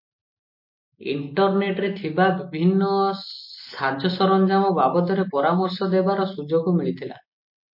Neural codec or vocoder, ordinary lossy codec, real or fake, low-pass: none; MP3, 32 kbps; real; 5.4 kHz